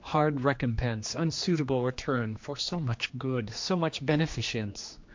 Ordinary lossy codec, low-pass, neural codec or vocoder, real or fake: MP3, 48 kbps; 7.2 kHz; codec, 16 kHz, 2 kbps, X-Codec, HuBERT features, trained on general audio; fake